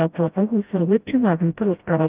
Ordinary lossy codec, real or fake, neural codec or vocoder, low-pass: Opus, 32 kbps; fake; codec, 16 kHz, 0.5 kbps, FreqCodec, smaller model; 3.6 kHz